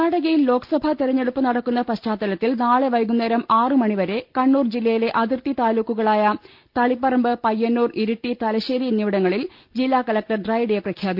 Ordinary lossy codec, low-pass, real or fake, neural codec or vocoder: Opus, 32 kbps; 5.4 kHz; real; none